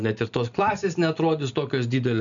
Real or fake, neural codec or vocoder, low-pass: real; none; 7.2 kHz